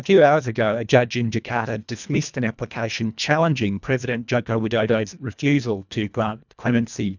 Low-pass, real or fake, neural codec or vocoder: 7.2 kHz; fake; codec, 24 kHz, 1.5 kbps, HILCodec